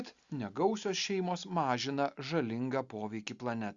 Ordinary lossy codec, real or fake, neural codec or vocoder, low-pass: Opus, 64 kbps; real; none; 7.2 kHz